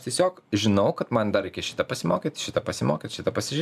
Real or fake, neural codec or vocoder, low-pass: real; none; 14.4 kHz